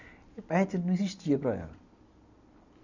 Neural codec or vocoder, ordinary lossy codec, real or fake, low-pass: none; none; real; 7.2 kHz